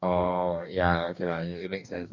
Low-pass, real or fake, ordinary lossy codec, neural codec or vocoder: 7.2 kHz; fake; none; codec, 44.1 kHz, 2.6 kbps, DAC